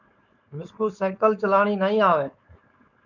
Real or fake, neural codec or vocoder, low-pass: fake; codec, 16 kHz, 4.8 kbps, FACodec; 7.2 kHz